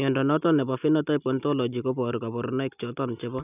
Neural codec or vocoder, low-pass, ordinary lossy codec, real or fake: none; 3.6 kHz; none; real